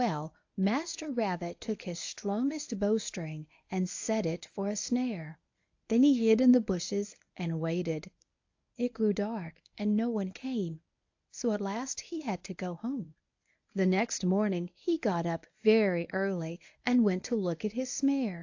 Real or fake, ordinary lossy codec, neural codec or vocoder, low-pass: fake; AAC, 48 kbps; codec, 24 kHz, 0.9 kbps, WavTokenizer, medium speech release version 1; 7.2 kHz